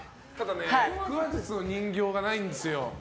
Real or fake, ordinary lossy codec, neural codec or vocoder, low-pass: real; none; none; none